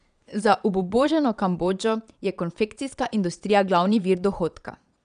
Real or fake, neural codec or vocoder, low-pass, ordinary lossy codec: fake; vocoder, 22.05 kHz, 80 mel bands, Vocos; 9.9 kHz; none